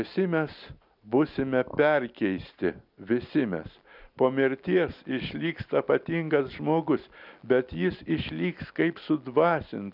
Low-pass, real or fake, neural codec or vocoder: 5.4 kHz; real; none